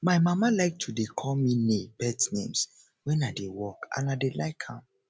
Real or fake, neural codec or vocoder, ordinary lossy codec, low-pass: real; none; none; none